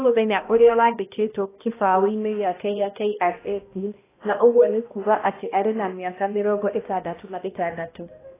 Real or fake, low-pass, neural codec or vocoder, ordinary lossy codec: fake; 3.6 kHz; codec, 16 kHz, 1 kbps, X-Codec, HuBERT features, trained on balanced general audio; AAC, 16 kbps